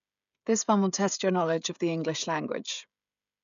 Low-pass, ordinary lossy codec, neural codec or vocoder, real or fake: 7.2 kHz; none; codec, 16 kHz, 16 kbps, FreqCodec, smaller model; fake